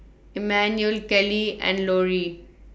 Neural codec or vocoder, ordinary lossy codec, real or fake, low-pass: none; none; real; none